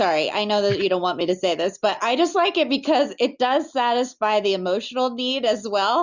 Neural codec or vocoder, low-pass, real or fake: none; 7.2 kHz; real